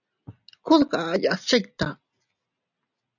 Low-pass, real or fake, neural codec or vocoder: 7.2 kHz; real; none